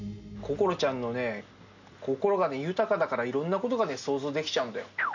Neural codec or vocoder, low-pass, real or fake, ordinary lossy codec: none; 7.2 kHz; real; none